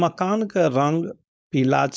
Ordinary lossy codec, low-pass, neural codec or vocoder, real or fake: none; none; codec, 16 kHz, 4.8 kbps, FACodec; fake